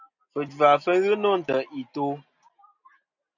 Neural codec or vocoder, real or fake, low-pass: none; real; 7.2 kHz